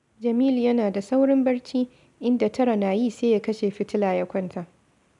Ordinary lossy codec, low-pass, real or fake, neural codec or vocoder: none; 10.8 kHz; real; none